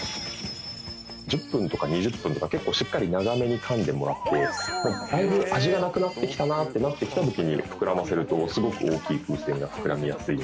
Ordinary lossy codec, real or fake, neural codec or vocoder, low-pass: none; real; none; none